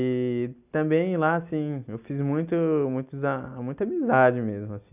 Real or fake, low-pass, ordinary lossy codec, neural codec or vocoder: real; 3.6 kHz; none; none